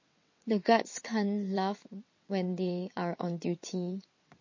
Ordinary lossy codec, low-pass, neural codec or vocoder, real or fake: MP3, 32 kbps; 7.2 kHz; vocoder, 22.05 kHz, 80 mel bands, WaveNeXt; fake